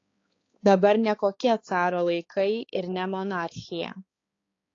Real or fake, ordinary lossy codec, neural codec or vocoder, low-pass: fake; AAC, 32 kbps; codec, 16 kHz, 4 kbps, X-Codec, HuBERT features, trained on balanced general audio; 7.2 kHz